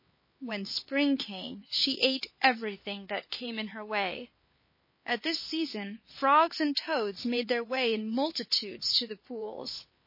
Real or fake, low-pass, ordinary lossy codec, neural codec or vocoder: fake; 5.4 kHz; MP3, 24 kbps; codec, 16 kHz, 4 kbps, X-Codec, HuBERT features, trained on LibriSpeech